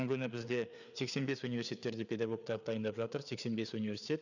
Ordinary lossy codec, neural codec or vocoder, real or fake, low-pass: none; codec, 16 kHz, 4 kbps, FreqCodec, larger model; fake; 7.2 kHz